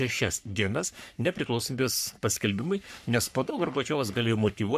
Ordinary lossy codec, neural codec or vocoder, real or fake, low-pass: MP3, 96 kbps; codec, 44.1 kHz, 3.4 kbps, Pupu-Codec; fake; 14.4 kHz